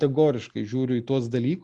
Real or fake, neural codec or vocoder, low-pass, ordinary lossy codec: real; none; 10.8 kHz; Opus, 64 kbps